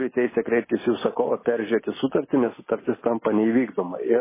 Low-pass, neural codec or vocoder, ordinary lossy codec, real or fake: 3.6 kHz; none; MP3, 16 kbps; real